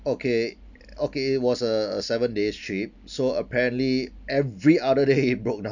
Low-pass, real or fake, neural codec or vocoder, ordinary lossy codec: 7.2 kHz; real; none; none